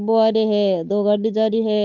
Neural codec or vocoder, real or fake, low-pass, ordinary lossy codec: codec, 16 kHz, 8 kbps, FunCodec, trained on Chinese and English, 25 frames a second; fake; 7.2 kHz; none